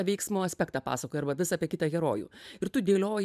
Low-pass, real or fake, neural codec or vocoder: 14.4 kHz; fake; vocoder, 44.1 kHz, 128 mel bands every 512 samples, BigVGAN v2